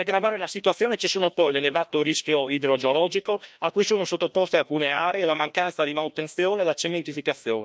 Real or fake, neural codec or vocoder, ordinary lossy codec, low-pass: fake; codec, 16 kHz, 1 kbps, FreqCodec, larger model; none; none